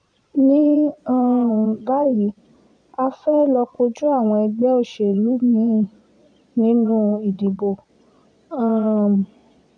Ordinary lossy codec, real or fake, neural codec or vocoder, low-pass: none; fake; vocoder, 22.05 kHz, 80 mel bands, Vocos; 9.9 kHz